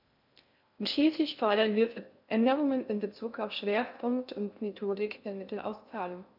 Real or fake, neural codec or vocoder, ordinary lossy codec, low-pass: fake; codec, 16 kHz in and 24 kHz out, 0.6 kbps, FocalCodec, streaming, 4096 codes; none; 5.4 kHz